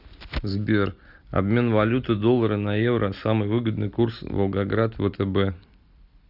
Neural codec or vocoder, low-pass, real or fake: none; 5.4 kHz; real